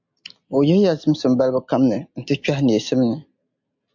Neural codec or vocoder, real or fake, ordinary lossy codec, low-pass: none; real; MP3, 64 kbps; 7.2 kHz